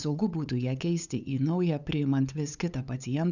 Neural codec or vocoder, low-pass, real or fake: codec, 16 kHz, 8 kbps, FunCodec, trained on LibriTTS, 25 frames a second; 7.2 kHz; fake